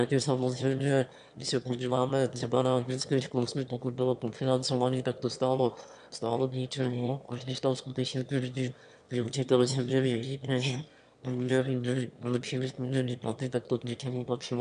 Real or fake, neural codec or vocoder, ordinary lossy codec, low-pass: fake; autoencoder, 22.05 kHz, a latent of 192 numbers a frame, VITS, trained on one speaker; AAC, 96 kbps; 9.9 kHz